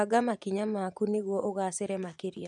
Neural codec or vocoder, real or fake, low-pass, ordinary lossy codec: vocoder, 24 kHz, 100 mel bands, Vocos; fake; 10.8 kHz; none